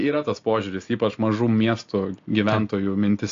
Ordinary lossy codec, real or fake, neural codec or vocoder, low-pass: AAC, 48 kbps; real; none; 7.2 kHz